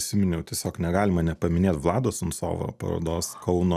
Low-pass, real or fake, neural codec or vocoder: 14.4 kHz; real; none